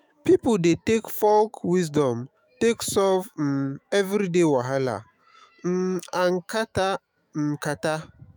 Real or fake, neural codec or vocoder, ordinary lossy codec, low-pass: fake; autoencoder, 48 kHz, 128 numbers a frame, DAC-VAE, trained on Japanese speech; none; none